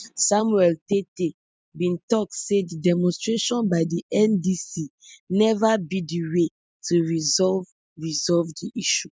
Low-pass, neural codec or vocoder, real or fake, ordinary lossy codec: none; none; real; none